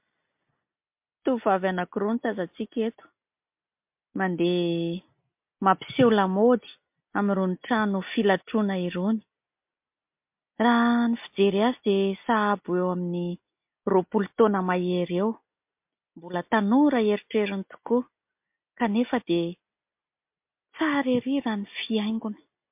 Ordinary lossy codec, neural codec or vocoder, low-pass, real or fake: MP3, 32 kbps; none; 3.6 kHz; real